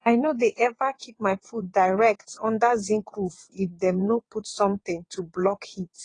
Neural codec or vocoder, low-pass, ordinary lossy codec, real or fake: vocoder, 22.05 kHz, 80 mel bands, WaveNeXt; 9.9 kHz; AAC, 32 kbps; fake